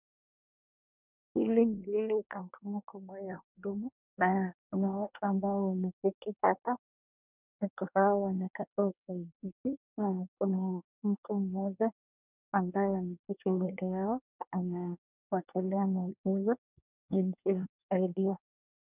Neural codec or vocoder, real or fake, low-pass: codec, 24 kHz, 1 kbps, SNAC; fake; 3.6 kHz